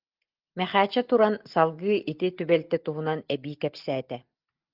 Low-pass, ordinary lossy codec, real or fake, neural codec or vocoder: 5.4 kHz; Opus, 32 kbps; real; none